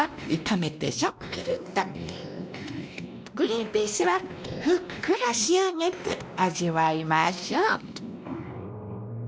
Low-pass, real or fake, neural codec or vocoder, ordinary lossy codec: none; fake; codec, 16 kHz, 1 kbps, X-Codec, WavLM features, trained on Multilingual LibriSpeech; none